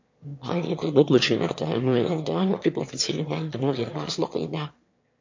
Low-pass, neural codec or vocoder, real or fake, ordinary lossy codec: 7.2 kHz; autoencoder, 22.05 kHz, a latent of 192 numbers a frame, VITS, trained on one speaker; fake; MP3, 48 kbps